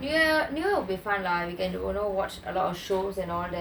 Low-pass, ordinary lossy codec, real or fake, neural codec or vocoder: none; none; real; none